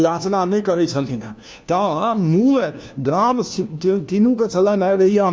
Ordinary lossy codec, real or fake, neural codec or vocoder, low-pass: none; fake; codec, 16 kHz, 1 kbps, FunCodec, trained on LibriTTS, 50 frames a second; none